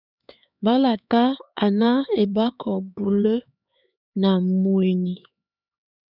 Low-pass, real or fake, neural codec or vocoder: 5.4 kHz; fake; codec, 16 kHz, 4 kbps, X-Codec, HuBERT features, trained on LibriSpeech